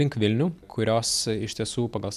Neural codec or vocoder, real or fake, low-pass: none; real; 14.4 kHz